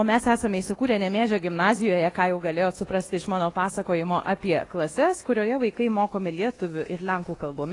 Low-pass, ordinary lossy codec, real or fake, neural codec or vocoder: 10.8 kHz; AAC, 32 kbps; fake; codec, 24 kHz, 1.2 kbps, DualCodec